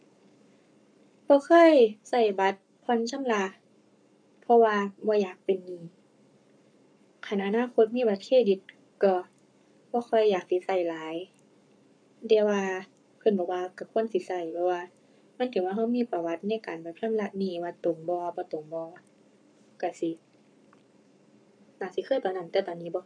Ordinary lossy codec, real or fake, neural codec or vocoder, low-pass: none; fake; codec, 44.1 kHz, 7.8 kbps, Pupu-Codec; 9.9 kHz